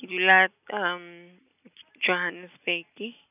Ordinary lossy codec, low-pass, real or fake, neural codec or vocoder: none; 3.6 kHz; real; none